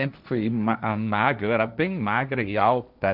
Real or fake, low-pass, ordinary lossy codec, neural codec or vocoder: fake; 5.4 kHz; none; codec, 16 kHz, 1.1 kbps, Voila-Tokenizer